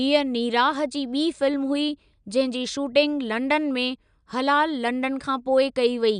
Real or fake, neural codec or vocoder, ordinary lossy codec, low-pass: real; none; none; 9.9 kHz